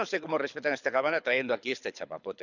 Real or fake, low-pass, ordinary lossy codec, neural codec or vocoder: fake; 7.2 kHz; none; codec, 24 kHz, 6 kbps, HILCodec